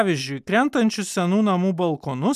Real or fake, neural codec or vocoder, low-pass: real; none; 14.4 kHz